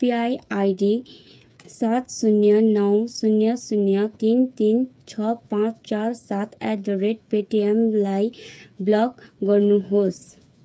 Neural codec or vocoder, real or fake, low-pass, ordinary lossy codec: codec, 16 kHz, 8 kbps, FreqCodec, smaller model; fake; none; none